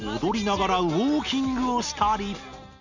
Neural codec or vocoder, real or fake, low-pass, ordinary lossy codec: none; real; 7.2 kHz; none